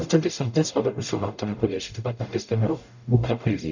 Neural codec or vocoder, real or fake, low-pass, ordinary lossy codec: codec, 44.1 kHz, 0.9 kbps, DAC; fake; 7.2 kHz; none